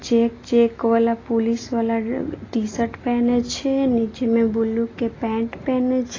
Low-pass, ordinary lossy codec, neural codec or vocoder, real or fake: 7.2 kHz; AAC, 32 kbps; none; real